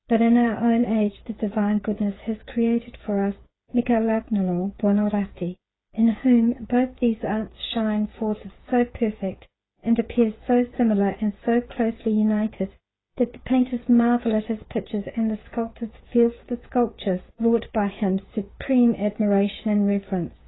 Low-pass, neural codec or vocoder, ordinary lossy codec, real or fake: 7.2 kHz; codec, 16 kHz, 8 kbps, FreqCodec, smaller model; AAC, 16 kbps; fake